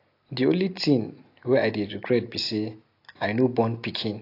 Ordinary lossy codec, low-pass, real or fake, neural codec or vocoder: AAC, 32 kbps; 5.4 kHz; real; none